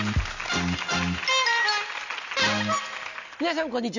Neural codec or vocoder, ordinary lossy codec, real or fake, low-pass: none; none; real; 7.2 kHz